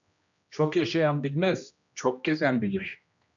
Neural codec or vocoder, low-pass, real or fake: codec, 16 kHz, 1 kbps, X-Codec, HuBERT features, trained on general audio; 7.2 kHz; fake